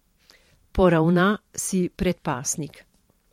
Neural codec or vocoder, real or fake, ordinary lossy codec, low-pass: vocoder, 44.1 kHz, 128 mel bands every 512 samples, BigVGAN v2; fake; MP3, 64 kbps; 19.8 kHz